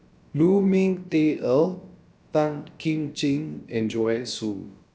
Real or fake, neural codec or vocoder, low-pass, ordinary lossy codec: fake; codec, 16 kHz, about 1 kbps, DyCAST, with the encoder's durations; none; none